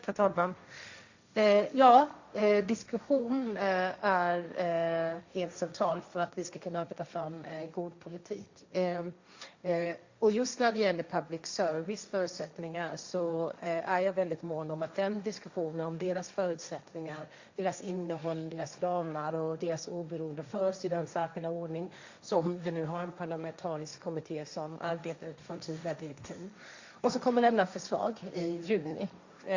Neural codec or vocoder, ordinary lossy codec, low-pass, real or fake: codec, 16 kHz, 1.1 kbps, Voila-Tokenizer; Opus, 64 kbps; 7.2 kHz; fake